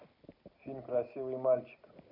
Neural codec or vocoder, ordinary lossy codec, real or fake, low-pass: none; none; real; 5.4 kHz